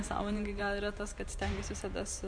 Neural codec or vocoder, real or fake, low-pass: vocoder, 44.1 kHz, 128 mel bands every 512 samples, BigVGAN v2; fake; 9.9 kHz